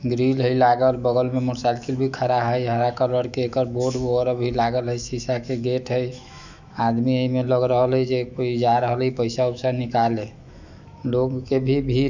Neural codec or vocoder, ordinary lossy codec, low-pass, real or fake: autoencoder, 48 kHz, 128 numbers a frame, DAC-VAE, trained on Japanese speech; none; 7.2 kHz; fake